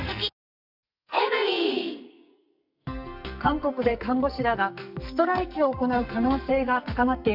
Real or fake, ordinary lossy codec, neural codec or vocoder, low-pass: fake; none; codec, 44.1 kHz, 2.6 kbps, SNAC; 5.4 kHz